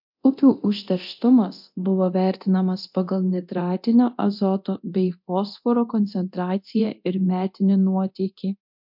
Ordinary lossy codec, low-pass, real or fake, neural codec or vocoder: MP3, 48 kbps; 5.4 kHz; fake; codec, 24 kHz, 0.9 kbps, DualCodec